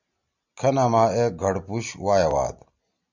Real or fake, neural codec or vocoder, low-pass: real; none; 7.2 kHz